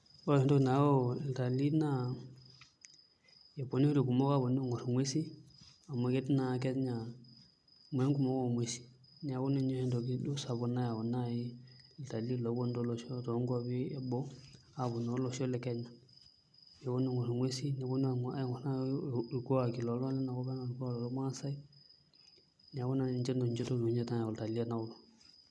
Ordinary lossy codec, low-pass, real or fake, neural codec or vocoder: none; none; real; none